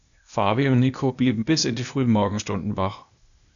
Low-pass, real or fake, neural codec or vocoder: 7.2 kHz; fake; codec, 16 kHz, 0.8 kbps, ZipCodec